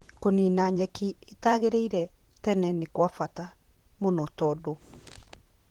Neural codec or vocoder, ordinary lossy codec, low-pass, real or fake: vocoder, 44.1 kHz, 128 mel bands, Pupu-Vocoder; Opus, 16 kbps; 19.8 kHz; fake